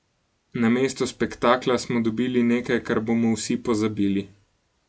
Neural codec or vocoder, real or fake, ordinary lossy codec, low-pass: none; real; none; none